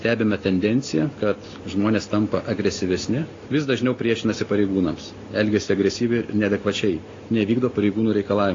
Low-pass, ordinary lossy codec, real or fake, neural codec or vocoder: 7.2 kHz; AAC, 32 kbps; real; none